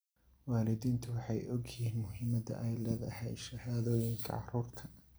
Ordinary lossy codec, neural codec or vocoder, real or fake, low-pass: none; none; real; none